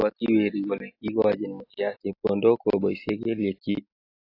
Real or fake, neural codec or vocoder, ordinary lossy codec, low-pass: real; none; AAC, 32 kbps; 5.4 kHz